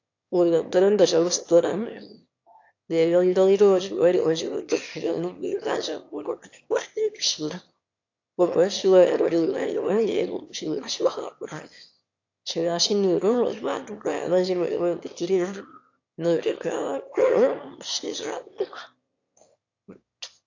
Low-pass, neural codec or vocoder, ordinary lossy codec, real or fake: 7.2 kHz; autoencoder, 22.05 kHz, a latent of 192 numbers a frame, VITS, trained on one speaker; AAC, 48 kbps; fake